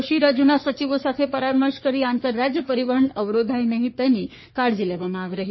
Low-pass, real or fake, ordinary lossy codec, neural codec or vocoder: 7.2 kHz; fake; MP3, 24 kbps; codec, 44.1 kHz, 3.4 kbps, Pupu-Codec